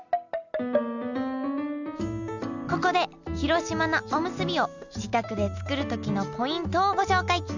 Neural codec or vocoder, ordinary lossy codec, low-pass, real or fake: none; none; 7.2 kHz; real